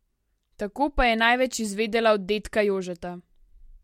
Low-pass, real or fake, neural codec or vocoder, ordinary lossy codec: 19.8 kHz; real; none; MP3, 64 kbps